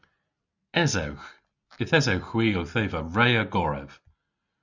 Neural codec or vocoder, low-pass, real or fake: none; 7.2 kHz; real